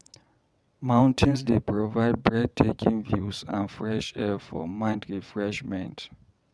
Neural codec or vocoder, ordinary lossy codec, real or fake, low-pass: vocoder, 22.05 kHz, 80 mel bands, WaveNeXt; none; fake; none